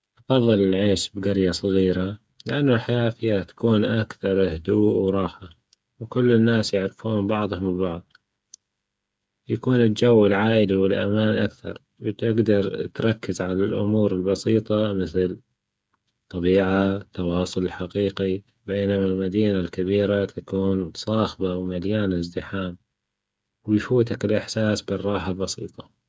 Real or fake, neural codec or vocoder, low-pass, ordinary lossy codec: fake; codec, 16 kHz, 8 kbps, FreqCodec, smaller model; none; none